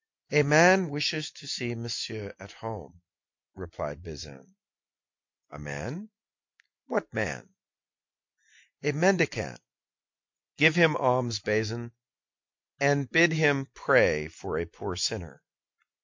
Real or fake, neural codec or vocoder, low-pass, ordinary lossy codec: real; none; 7.2 kHz; MP3, 48 kbps